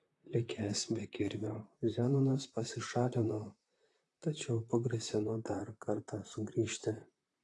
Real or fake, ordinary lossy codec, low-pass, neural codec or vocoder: fake; AAC, 48 kbps; 10.8 kHz; vocoder, 44.1 kHz, 128 mel bands, Pupu-Vocoder